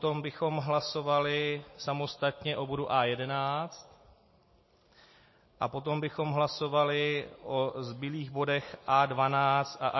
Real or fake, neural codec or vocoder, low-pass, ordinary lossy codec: real; none; 7.2 kHz; MP3, 24 kbps